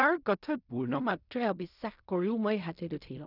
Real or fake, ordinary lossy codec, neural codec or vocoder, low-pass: fake; none; codec, 16 kHz in and 24 kHz out, 0.4 kbps, LongCat-Audio-Codec, fine tuned four codebook decoder; 5.4 kHz